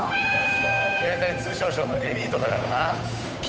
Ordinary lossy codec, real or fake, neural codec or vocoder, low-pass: none; fake; codec, 16 kHz, 8 kbps, FunCodec, trained on Chinese and English, 25 frames a second; none